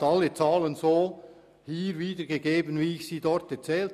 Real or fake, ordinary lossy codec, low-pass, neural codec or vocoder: real; none; 14.4 kHz; none